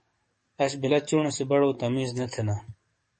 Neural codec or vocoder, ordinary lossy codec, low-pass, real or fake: codec, 44.1 kHz, 7.8 kbps, DAC; MP3, 32 kbps; 10.8 kHz; fake